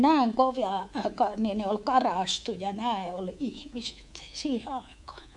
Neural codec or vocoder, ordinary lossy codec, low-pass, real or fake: codec, 24 kHz, 3.1 kbps, DualCodec; none; 10.8 kHz; fake